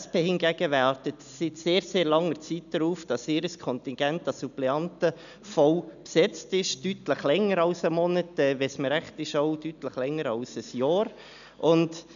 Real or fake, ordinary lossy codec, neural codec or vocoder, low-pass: real; none; none; 7.2 kHz